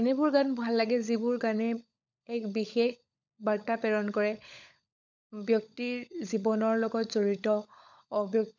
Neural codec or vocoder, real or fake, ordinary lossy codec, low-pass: codec, 16 kHz, 16 kbps, FunCodec, trained on LibriTTS, 50 frames a second; fake; none; 7.2 kHz